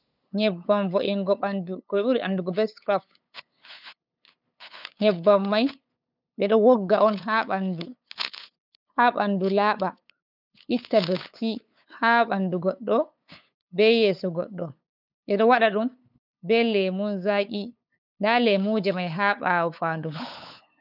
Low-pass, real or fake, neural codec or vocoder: 5.4 kHz; fake; codec, 16 kHz, 8 kbps, FunCodec, trained on LibriTTS, 25 frames a second